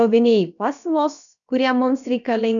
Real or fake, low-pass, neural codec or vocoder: fake; 7.2 kHz; codec, 16 kHz, about 1 kbps, DyCAST, with the encoder's durations